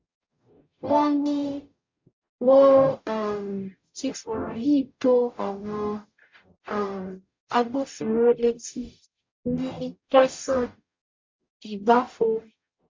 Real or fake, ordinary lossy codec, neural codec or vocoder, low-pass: fake; MP3, 48 kbps; codec, 44.1 kHz, 0.9 kbps, DAC; 7.2 kHz